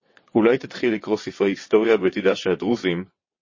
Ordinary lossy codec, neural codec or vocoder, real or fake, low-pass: MP3, 32 kbps; vocoder, 22.05 kHz, 80 mel bands, WaveNeXt; fake; 7.2 kHz